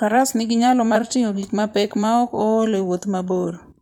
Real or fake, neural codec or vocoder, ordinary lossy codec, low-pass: fake; vocoder, 44.1 kHz, 128 mel bands, Pupu-Vocoder; MP3, 96 kbps; 19.8 kHz